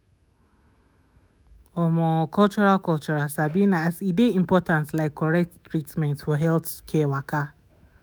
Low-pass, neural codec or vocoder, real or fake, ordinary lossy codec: none; autoencoder, 48 kHz, 128 numbers a frame, DAC-VAE, trained on Japanese speech; fake; none